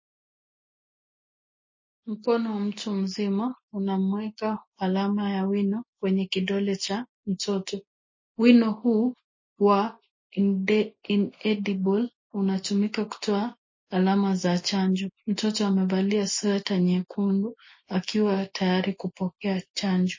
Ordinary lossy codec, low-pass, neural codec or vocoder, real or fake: MP3, 32 kbps; 7.2 kHz; none; real